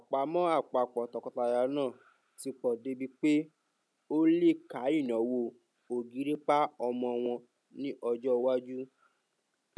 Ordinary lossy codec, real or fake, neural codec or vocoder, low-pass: none; real; none; none